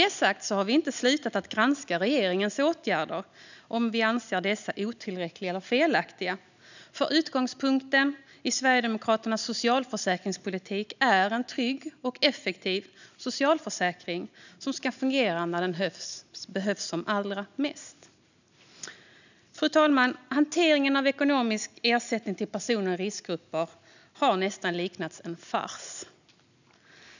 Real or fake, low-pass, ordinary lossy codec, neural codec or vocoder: real; 7.2 kHz; none; none